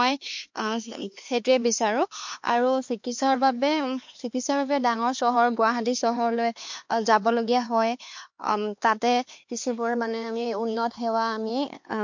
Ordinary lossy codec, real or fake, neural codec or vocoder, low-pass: MP3, 48 kbps; fake; codec, 16 kHz, 4 kbps, X-Codec, HuBERT features, trained on LibriSpeech; 7.2 kHz